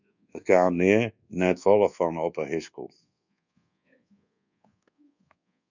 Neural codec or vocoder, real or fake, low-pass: codec, 24 kHz, 1.2 kbps, DualCodec; fake; 7.2 kHz